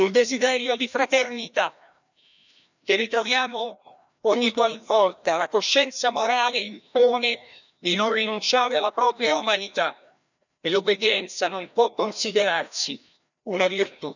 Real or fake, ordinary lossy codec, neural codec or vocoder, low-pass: fake; none; codec, 16 kHz, 1 kbps, FreqCodec, larger model; 7.2 kHz